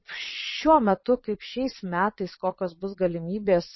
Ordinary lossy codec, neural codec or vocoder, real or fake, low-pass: MP3, 24 kbps; vocoder, 22.05 kHz, 80 mel bands, Vocos; fake; 7.2 kHz